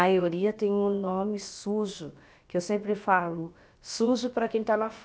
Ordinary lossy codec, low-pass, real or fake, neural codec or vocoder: none; none; fake; codec, 16 kHz, about 1 kbps, DyCAST, with the encoder's durations